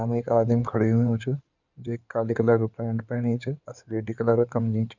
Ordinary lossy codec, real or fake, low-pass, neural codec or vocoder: none; fake; 7.2 kHz; codec, 16 kHz in and 24 kHz out, 2.2 kbps, FireRedTTS-2 codec